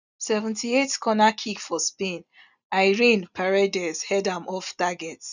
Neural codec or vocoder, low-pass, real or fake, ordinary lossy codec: none; 7.2 kHz; real; none